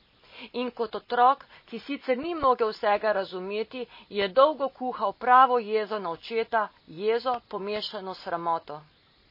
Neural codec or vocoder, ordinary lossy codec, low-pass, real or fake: none; MP3, 24 kbps; 5.4 kHz; real